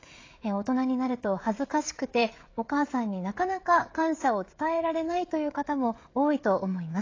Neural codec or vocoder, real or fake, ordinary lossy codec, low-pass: codec, 16 kHz, 16 kbps, FreqCodec, smaller model; fake; AAC, 32 kbps; 7.2 kHz